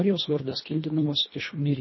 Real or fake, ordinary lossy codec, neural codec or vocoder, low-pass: fake; MP3, 24 kbps; codec, 24 kHz, 1.5 kbps, HILCodec; 7.2 kHz